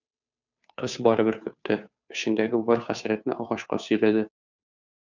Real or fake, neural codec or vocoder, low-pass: fake; codec, 16 kHz, 2 kbps, FunCodec, trained on Chinese and English, 25 frames a second; 7.2 kHz